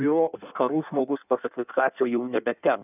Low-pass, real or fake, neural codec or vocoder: 3.6 kHz; fake; codec, 16 kHz in and 24 kHz out, 1.1 kbps, FireRedTTS-2 codec